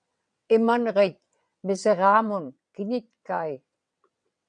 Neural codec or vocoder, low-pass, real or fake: vocoder, 22.05 kHz, 80 mel bands, WaveNeXt; 9.9 kHz; fake